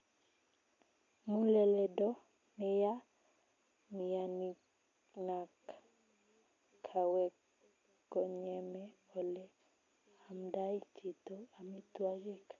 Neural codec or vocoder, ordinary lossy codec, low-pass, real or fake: none; AAC, 48 kbps; 7.2 kHz; real